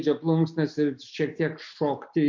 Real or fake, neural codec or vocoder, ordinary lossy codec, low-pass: real; none; MP3, 48 kbps; 7.2 kHz